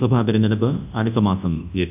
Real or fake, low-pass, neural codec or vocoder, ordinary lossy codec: fake; 3.6 kHz; codec, 24 kHz, 0.9 kbps, WavTokenizer, large speech release; AAC, 32 kbps